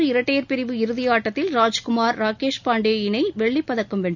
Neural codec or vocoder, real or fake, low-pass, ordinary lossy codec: none; real; 7.2 kHz; none